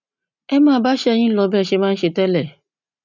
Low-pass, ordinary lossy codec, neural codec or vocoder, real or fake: 7.2 kHz; none; none; real